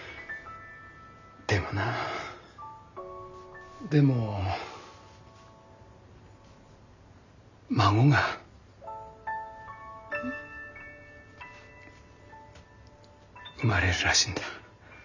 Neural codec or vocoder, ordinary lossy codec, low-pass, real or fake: none; none; 7.2 kHz; real